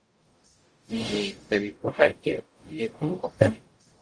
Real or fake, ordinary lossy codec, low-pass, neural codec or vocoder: fake; Opus, 32 kbps; 9.9 kHz; codec, 44.1 kHz, 0.9 kbps, DAC